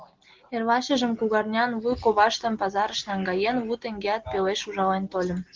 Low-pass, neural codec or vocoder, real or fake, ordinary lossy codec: 7.2 kHz; none; real; Opus, 16 kbps